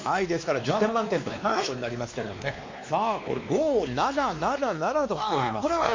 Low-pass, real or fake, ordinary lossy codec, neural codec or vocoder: 7.2 kHz; fake; MP3, 48 kbps; codec, 16 kHz, 2 kbps, X-Codec, WavLM features, trained on Multilingual LibriSpeech